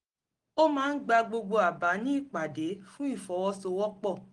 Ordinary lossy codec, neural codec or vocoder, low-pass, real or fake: Opus, 24 kbps; none; 10.8 kHz; real